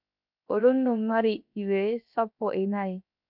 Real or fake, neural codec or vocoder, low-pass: fake; codec, 16 kHz, 0.7 kbps, FocalCodec; 5.4 kHz